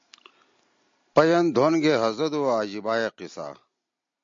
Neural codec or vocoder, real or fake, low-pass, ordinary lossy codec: none; real; 7.2 kHz; MP3, 48 kbps